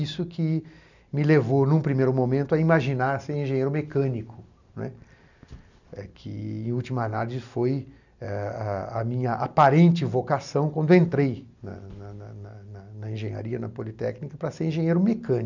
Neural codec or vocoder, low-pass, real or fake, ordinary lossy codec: none; 7.2 kHz; real; none